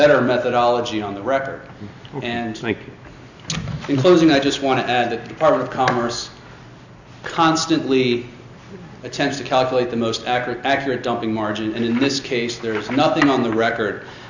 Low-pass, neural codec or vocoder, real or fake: 7.2 kHz; none; real